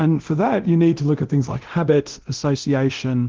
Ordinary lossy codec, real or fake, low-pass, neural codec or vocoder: Opus, 16 kbps; fake; 7.2 kHz; codec, 24 kHz, 0.9 kbps, DualCodec